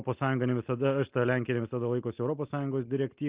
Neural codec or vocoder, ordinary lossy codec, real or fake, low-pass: none; Opus, 24 kbps; real; 3.6 kHz